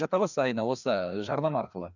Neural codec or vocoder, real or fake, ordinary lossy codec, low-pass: codec, 16 kHz, 2 kbps, FreqCodec, larger model; fake; none; 7.2 kHz